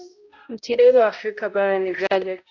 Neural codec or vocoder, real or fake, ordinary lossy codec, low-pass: codec, 16 kHz, 0.5 kbps, X-Codec, HuBERT features, trained on balanced general audio; fake; AAC, 48 kbps; 7.2 kHz